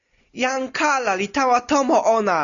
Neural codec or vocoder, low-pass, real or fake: none; 7.2 kHz; real